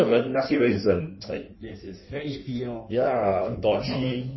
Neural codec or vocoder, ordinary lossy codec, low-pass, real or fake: codec, 16 kHz in and 24 kHz out, 1.1 kbps, FireRedTTS-2 codec; MP3, 24 kbps; 7.2 kHz; fake